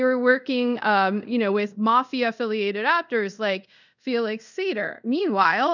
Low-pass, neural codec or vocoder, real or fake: 7.2 kHz; codec, 24 kHz, 0.5 kbps, DualCodec; fake